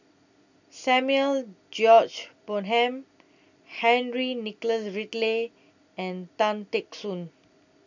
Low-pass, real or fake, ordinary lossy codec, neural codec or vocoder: 7.2 kHz; real; none; none